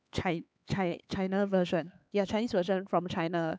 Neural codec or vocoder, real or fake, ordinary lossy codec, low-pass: codec, 16 kHz, 4 kbps, X-Codec, HuBERT features, trained on LibriSpeech; fake; none; none